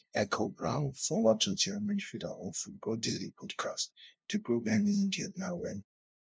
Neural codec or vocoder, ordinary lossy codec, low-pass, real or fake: codec, 16 kHz, 0.5 kbps, FunCodec, trained on LibriTTS, 25 frames a second; none; none; fake